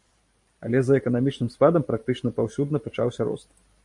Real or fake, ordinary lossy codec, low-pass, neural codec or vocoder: fake; MP3, 64 kbps; 10.8 kHz; vocoder, 44.1 kHz, 128 mel bands every 512 samples, BigVGAN v2